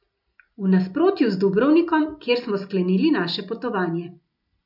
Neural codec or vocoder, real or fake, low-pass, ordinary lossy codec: none; real; 5.4 kHz; none